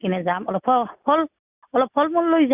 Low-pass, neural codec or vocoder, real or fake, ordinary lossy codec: 3.6 kHz; none; real; Opus, 64 kbps